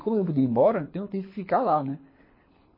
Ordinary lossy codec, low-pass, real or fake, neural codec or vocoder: MP3, 24 kbps; 5.4 kHz; fake; codec, 24 kHz, 6 kbps, HILCodec